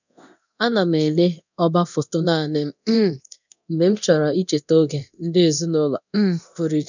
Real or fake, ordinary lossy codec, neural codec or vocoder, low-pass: fake; none; codec, 24 kHz, 0.9 kbps, DualCodec; 7.2 kHz